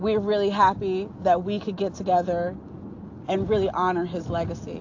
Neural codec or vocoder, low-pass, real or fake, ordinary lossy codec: none; 7.2 kHz; real; AAC, 48 kbps